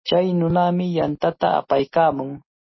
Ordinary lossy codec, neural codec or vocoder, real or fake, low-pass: MP3, 24 kbps; none; real; 7.2 kHz